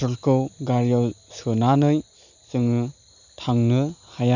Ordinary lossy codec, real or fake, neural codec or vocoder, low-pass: none; real; none; 7.2 kHz